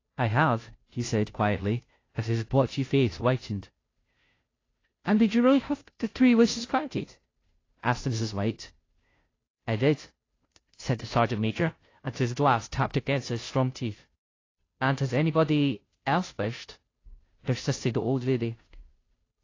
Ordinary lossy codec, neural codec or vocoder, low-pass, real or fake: AAC, 32 kbps; codec, 16 kHz, 0.5 kbps, FunCodec, trained on Chinese and English, 25 frames a second; 7.2 kHz; fake